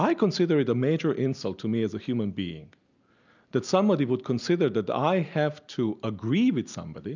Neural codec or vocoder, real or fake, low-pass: none; real; 7.2 kHz